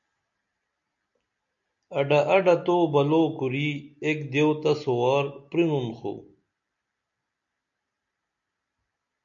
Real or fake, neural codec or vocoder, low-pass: real; none; 7.2 kHz